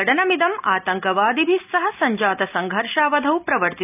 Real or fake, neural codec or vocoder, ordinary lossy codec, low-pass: real; none; none; 3.6 kHz